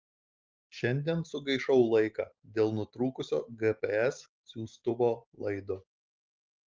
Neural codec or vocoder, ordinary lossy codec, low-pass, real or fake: none; Opus, 32 kbps; 7.2 kHz; real